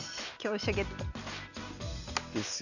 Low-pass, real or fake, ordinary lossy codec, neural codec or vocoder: 7.2 kHz; real; none; none